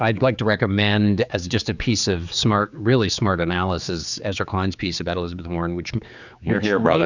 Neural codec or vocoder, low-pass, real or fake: codec, 16 kHz, 4 kbps, X-Codec, HuBERT features, trained on general audio; 7.2 kHz; fake